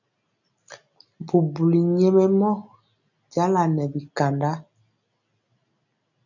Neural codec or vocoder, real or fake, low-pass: none; real; 7.2 kHz